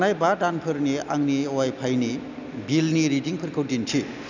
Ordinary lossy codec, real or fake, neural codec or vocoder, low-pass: none; real; none; 7.2 kHz